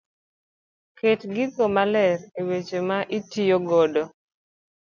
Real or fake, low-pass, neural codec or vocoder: real; 7.2 kHz; none